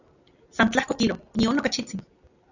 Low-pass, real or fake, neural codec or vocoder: 7.2 kHz; real; none